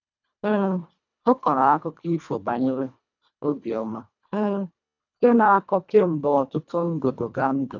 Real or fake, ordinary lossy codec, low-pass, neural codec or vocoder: fake; none; 7.2 kHz; codec, 24 kHz, 1.5 kbps, HILCodec